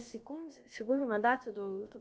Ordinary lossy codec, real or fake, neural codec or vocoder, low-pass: none; fake; codec, 16 kHz, about 1 kbps, DyCAST, with the encoder's durations; none